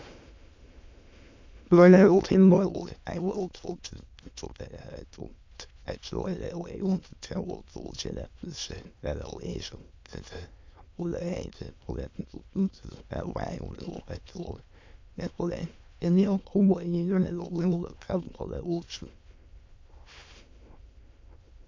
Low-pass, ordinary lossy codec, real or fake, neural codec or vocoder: 7.2 kHz; MP3, 48 kbps; fake; autoencoder, 22.05 kHz, a latent of 192 numbers a frame, VITS, trained on many speakers